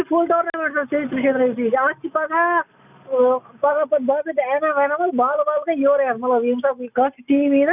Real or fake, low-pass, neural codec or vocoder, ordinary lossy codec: real; 3.6 kHz; none; none